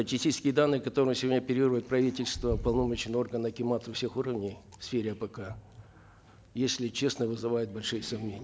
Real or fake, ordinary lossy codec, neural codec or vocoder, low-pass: real; none; none; none